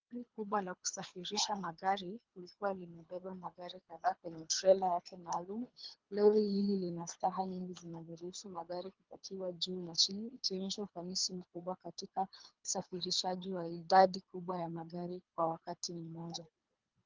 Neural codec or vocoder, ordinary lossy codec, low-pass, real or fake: codec, 24 kHz, 3 kbps, HILCodec; Opus, 24 kbps; 7.2 kHz; fake